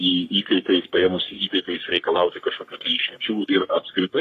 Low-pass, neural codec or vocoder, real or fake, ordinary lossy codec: 14.4 kHz; codec, 44.1 kHz, 3.4 kbps, Pupu-Codec; fake; AAC, 48 kbps